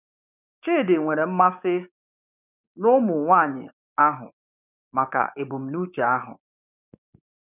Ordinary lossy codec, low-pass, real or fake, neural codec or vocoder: none; 3.6 kHz; fake; codec, 16 kHz, 6 kbps, DAC